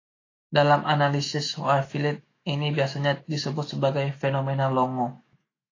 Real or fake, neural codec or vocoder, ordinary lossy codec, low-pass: fake; autoencoder, 48 kHz, 128 numbers a frame, DAC-VAE, trained on Japanese speech; AAC, 32 kbps; 7.2 kHz